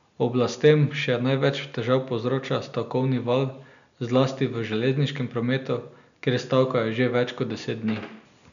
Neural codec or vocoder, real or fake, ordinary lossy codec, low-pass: none; real; none; 7.2 kHz